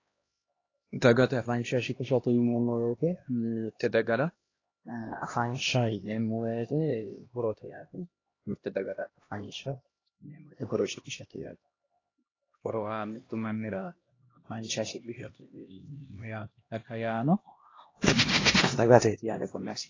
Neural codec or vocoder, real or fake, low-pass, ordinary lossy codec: codec, 16 kHz, 1 kbps, X-Codec, HuBERT features, trained on LibriSpeech; fake; 7.2 kHz; AAC, 32 kbps